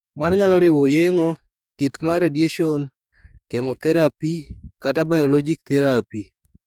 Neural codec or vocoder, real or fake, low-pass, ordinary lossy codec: codec, 44.1 kHz, 2.6 kbps, DAC; fake; 19.8 kHz; none